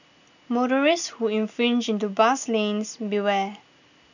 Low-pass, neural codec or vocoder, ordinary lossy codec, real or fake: 7.2 kHz; none; none; real